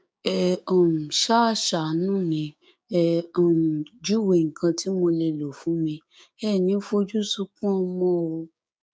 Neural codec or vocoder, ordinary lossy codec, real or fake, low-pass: codec, 16 kHz, 6 kbps, DAC; none; fake; none